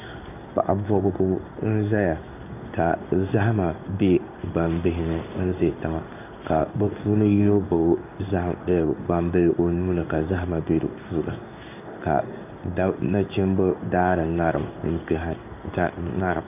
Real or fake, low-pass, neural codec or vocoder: fake; 3.6 kHz; codec, 16 kHz in and 24 kHz out, 1 kbps, XY-Tokenizer